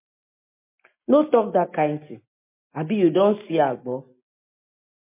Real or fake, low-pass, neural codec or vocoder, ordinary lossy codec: real; 3.6 kHz; none; MP3, 24 kbps